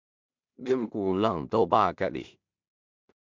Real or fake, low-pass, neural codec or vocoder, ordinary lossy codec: fake; 7.2 kHz; codec, 16 kHz in and 24 kHz out, 0.4 kbps, LongCat-Audio-Codec, two codebook decoder; MP3, 64 kbps